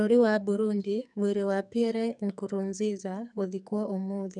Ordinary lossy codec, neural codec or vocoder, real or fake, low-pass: none; codec, 44.1 kHz, 2.6 kbps, SNAC; fake; 10.8 kHz